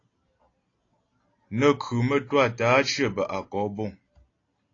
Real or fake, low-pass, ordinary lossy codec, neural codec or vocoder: real; 7.2 kHz; AAC, 32 kbps; none